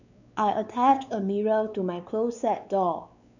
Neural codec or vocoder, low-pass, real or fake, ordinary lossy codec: codec, 16 kHz, 4 kbps, X-Codec, WavLM features, trained on Multilingual LibriSpeech; 7.2 kHz; fake; none